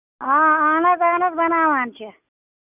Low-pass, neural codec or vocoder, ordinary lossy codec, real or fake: 3.6 kHz; none; none; real